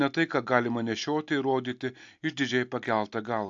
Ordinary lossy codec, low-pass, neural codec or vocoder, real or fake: AAC, 64 kbps; 7.2 kHz; none; real